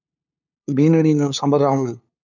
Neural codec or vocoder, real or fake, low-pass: codec, 16 kHz, 2 kbps, FunCodec, trained on LibriTTS, 25 frames a second; fake; 7.2 kHz